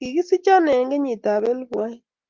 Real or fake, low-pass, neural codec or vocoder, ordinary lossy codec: real; 7.2 kHz; none; Opus, 32 kbps